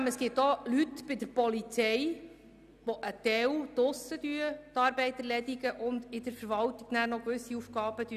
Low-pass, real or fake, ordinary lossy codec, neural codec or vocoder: 14.4 kHz; real; none; none